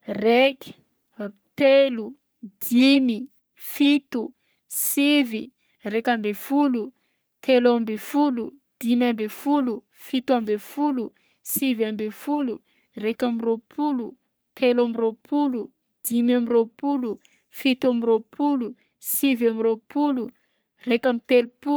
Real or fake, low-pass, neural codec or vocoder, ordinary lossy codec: fake; none; codec, 44.1 kHz, 3.4 kbps, Pupu-Codec; none